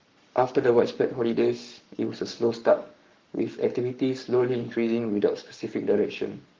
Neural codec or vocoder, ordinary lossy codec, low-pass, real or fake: codec, 44.1 kHz, 7.8 kbps, Pupu-Codec; Opus, 32 kbps; 7.2 kHz; fake